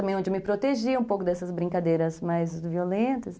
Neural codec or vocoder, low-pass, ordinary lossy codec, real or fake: none; none; none; real